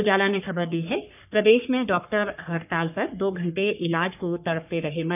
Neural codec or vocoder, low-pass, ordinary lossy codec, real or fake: codec, 44.1 kHz, 3.4 kbps, Pupu-Codec; 3.6 kHz; none; fake